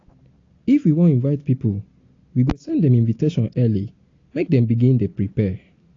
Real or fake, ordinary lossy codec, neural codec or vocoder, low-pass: real; AAC, 32 kbps; none; 7.2 kHz